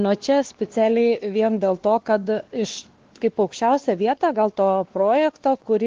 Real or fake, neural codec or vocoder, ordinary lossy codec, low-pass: fake; codec, 16 kHz, 2 kbps, X-Codec, WavLM features, trained on Multilingual LibriSpeech; Opus, 16 kbps; 7.2 kHz